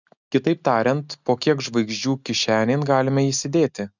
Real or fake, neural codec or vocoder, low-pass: real; none; 7.2 kHz